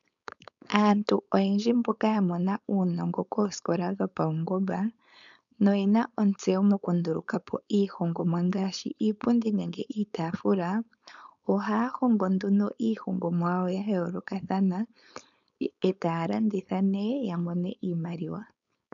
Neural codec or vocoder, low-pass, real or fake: codec, 16 kHz, 4.8 kbps, FACodec; 7.2 kHz; fake